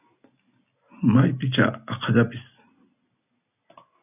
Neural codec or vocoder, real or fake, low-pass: none; real; 3.6 kHz